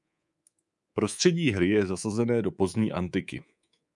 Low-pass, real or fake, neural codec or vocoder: 10.8 kHz; fake; codec, 24 kHz, 3.1 kbps, DualCodec